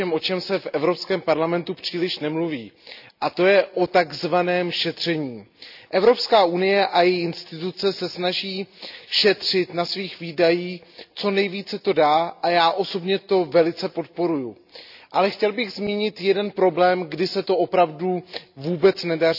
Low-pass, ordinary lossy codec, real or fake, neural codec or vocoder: 5.4 kHz; none; real; none